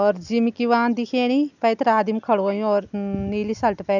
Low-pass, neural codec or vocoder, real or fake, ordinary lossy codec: 7.2 kHz; none; real; none